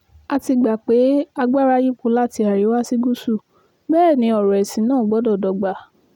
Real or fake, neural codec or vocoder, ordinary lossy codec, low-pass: real; none; none; 19.8 kHz